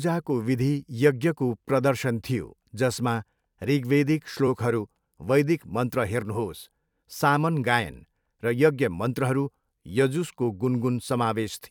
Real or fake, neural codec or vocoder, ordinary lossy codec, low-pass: fake; vocoder, 44.1 kHz, 128 mel bands every 256 samples, BigVGAN v2; none; 19.8 kHz